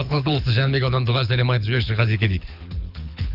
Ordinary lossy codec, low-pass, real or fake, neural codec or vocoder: none; 5.4 kHz; fake; codec, 24 kHz, 6 kbps, HILCodec